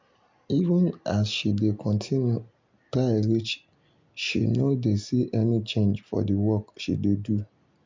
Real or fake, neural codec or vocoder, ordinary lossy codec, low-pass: real; none; MP3, 64 kbps; 7.2 kHz